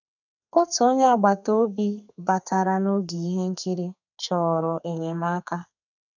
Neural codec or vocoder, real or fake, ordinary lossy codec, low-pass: codec, 32 kHz, 1.9 kbps, SNAC; fake; none; 7.2 kHz